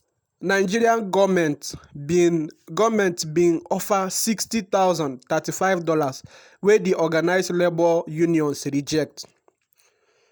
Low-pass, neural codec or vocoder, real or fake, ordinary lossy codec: none; none; real; none